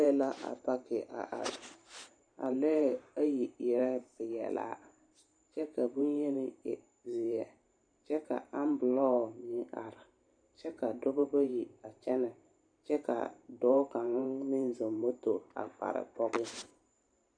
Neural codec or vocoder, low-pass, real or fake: vocoder, 44.1 kHz, 128 mel bands every 512 samples, BigVGAN v2; 9.9 kHz; fake